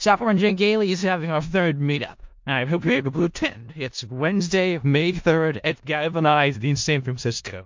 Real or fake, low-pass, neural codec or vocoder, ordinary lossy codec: fake; 7.2 kHz; codec, 16 kHz in and 24 kHz out, 0.4 kbps, LongCat-Audio-Codec, four codebook decoder; MP3, 48 kbps